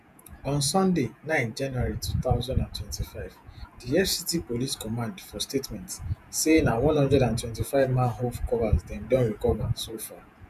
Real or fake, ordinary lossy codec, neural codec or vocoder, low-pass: fake; none; vocoder, 48 kHz, 128 mel bands, Vocos; 14.4 kHz